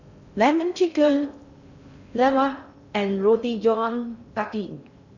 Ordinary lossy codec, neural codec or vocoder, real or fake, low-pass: none; codec, 16 kHz in and 24 kHz out, 0.6 kbps, FocalCodec, streaming, 4096 codes; fake; 7.2 kHz